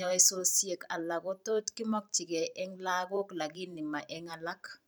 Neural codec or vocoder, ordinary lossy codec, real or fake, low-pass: vocoder, 44.1 kHz, 128 mel bands every 512 samples, BigVGAN v2; none; fake; none